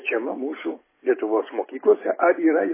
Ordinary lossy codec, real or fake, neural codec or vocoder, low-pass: MP3, 16 kbps; real; none; 3.6 kHz